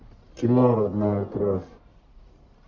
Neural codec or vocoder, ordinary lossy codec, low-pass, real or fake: codec, 44.1 kHz, 1.7 kbps, Pupu-Codec; MP3, 48 kbps; 7.2 kHz; fake